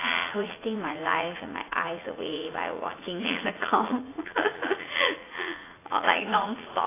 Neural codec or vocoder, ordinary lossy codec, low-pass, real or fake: vocoder, 44.1 kHz, 80 mel bands, Vocos; AAC, 16 kbps; 3.6 kHz; fake